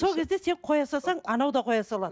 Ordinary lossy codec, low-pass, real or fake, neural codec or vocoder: none; none; real; none